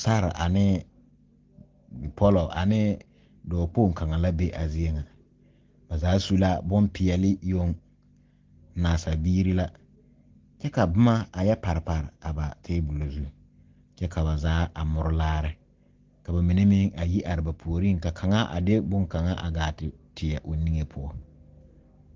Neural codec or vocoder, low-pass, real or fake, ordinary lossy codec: none; 7.2 kHz; real; Opus, 16 kbps